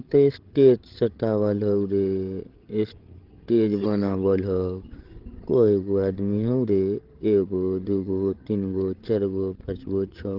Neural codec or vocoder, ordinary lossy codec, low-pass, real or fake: codec, 16 kHz, 16 kbps, FreqCodec, larger model; Opus, 16 kbps; 5.4 kHz; fake